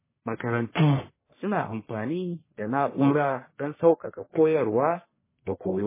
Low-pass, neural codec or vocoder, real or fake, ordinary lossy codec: 3.6 kHz; codec, 44.1 kHz, 1.7 kbps, Pupu-Codec; fake; MP3, 16 kbps